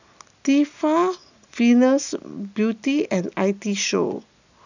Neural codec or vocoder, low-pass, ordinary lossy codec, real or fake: none; 7.2 kHz; none; real